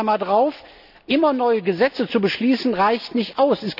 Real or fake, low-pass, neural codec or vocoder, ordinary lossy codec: real; 5.4 kHz; none; none